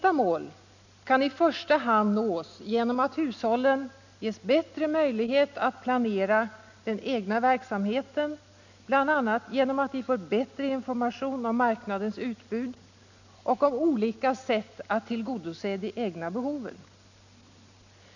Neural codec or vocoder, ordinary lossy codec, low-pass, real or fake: none; none; 7.2 kHz; real